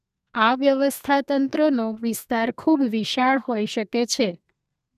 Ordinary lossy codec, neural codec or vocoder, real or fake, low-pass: none; codec, 32 kHz, 1.9 kbps, SNAC; fake; 14.4 kHz